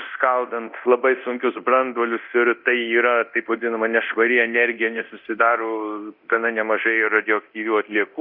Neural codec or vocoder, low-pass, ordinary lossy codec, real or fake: codec, 24 kHz, 0.9 kbps, DualCodec; 5.4 kHz; Opus, 64 kbps; fake